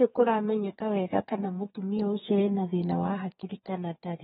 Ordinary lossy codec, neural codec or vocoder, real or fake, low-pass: AAC, 16 kbps; codec, 32 kHz, 1.9 kbps, SNAC; fake; 14.4 kHz